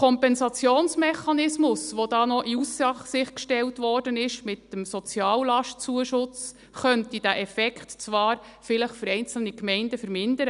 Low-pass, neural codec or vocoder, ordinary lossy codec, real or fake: 10.8 kHz; none; none; real